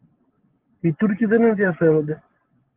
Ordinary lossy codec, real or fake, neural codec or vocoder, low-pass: Opus, 16 kbps; real; none; 3.6 kHz